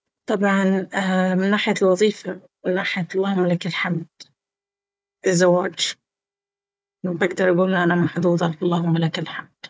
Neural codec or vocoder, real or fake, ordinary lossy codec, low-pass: codec, 16 kHz, 4 kbps, FunCodec, trained on Chinese and English, 50 frames a second; fake; none; none